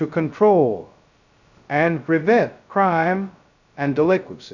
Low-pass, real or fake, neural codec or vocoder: 7.2 kHz; fake; codec, 16 kHz, 0.2 kbps, FocalCodec